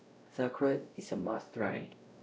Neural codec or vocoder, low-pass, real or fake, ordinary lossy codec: codec, 16 kHz, 0.5 kbps, X-Codec, WavLM features, trained on Multilingual LibriSpeech; none; fake; none